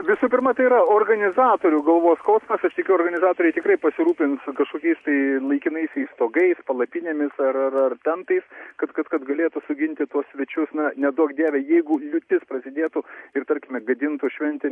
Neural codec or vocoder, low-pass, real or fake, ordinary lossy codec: none; 10.8 kHz; real; MP3, 48 kbps